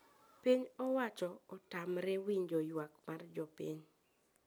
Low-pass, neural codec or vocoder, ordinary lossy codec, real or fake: none; none; none; real